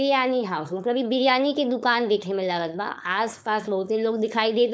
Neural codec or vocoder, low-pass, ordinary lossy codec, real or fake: codec, 16 kHz, 4.8 kbps, FACodec; none; none; fake